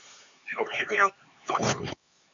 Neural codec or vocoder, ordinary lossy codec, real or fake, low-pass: codec, 16 kHz, 4 kbps, X-Codec, HuBERT features, trained on LibriSpeech; AAC, 64 kbps; fake; 7.2 kHz